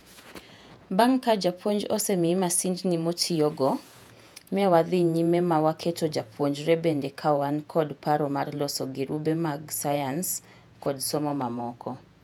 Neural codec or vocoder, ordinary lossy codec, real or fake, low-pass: vocoder, 48 kHz, 128 mel bands, Vocos; none; fake; 19.8 kHz